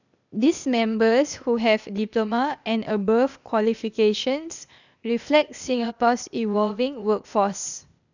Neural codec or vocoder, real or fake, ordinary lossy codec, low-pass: codec, 16 kHz, 0.8 kbps, ZipCodec; fake; none; 7.2 kHz